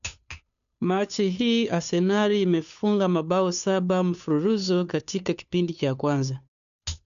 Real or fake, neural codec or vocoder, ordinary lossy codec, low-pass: fake; codec, 16 kHz, 2 kbps, FunCodec, trained on Chinese and English, 25 frames a second; none; 7.2 kHz